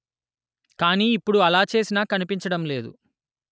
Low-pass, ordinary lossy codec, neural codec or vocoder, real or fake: none; none; none; real